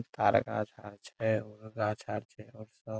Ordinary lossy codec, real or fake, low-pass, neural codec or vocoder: none; real; none; none